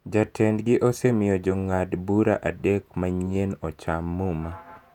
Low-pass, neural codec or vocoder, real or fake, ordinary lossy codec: 19.8 kHz; vocoder, 48 kHz, 128 mel bands, Vocos; fake; none